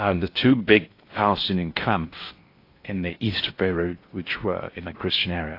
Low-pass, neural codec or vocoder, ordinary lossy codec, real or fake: 5.4 kHz; codec, 16 kHz in and 24 kHz out, 0.6 kbps, FocalCodec, streaming, 4096 codes; AAC, 32 kbps; fake